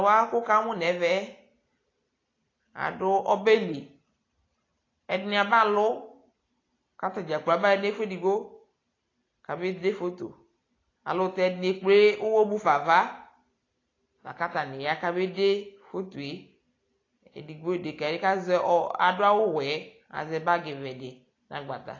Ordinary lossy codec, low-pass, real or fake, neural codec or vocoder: AAC, 32 kbps; 7.2 kHz; real; none